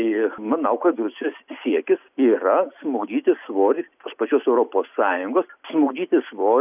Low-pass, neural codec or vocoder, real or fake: 3.6 kHz; none; real